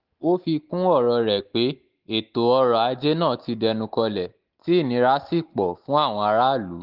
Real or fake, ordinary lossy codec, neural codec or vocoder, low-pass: real; Opus, 24 kbps; none; 5.4 kHz